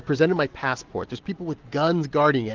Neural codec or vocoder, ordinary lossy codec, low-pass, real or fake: none; Opus, 16 kbps; 7.2 kHz; real